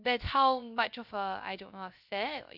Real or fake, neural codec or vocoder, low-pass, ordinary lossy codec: fake; codec, 16 kHz, 0.3 kbps, FocalCodec; 5.4 kHz; AAC, 48 kbps